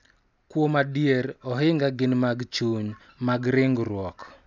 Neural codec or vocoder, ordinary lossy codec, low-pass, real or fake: none; none; 7.2 kHz; real